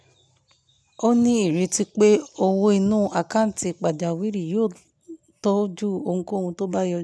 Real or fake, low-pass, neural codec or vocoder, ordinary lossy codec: real; none; none; none